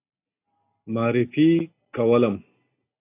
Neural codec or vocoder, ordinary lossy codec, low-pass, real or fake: none; AAC, 24 kbps; 3.6 kHz; real